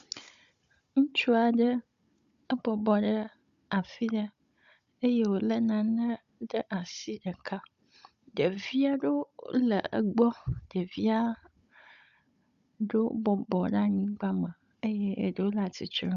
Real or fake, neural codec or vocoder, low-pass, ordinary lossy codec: fake; codec, 16 kHz, 16 kbps, FunCodec, trained on Chinese and English, 50 frames a second; 7.2 kHz; Opus, 64 kbps